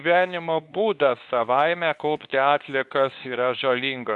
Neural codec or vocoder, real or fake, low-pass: codec, 24 kHz, 0.9 kbps, WavTokenizer, medium speech release version 2; fake; 10.8 kHz